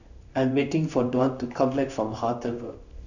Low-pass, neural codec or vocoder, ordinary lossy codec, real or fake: 7.2 kHz; codec, 16 kHz in and 24 kHz out, 1 kbps, XY-Tokenizer; none; fake